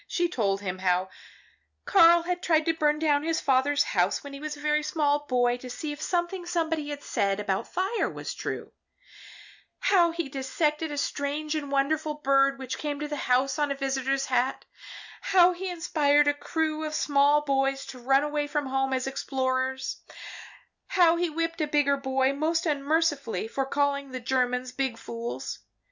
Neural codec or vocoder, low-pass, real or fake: none; 7.2 kHz; real